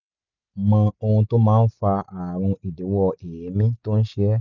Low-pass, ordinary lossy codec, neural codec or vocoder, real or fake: 7.2 kHz; none; none; real